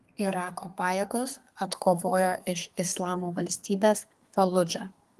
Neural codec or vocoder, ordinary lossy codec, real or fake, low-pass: codec, 32 kHz, 1.9 kbps, SNAC; Opus, 24 kbps; fake; 14.4 kHz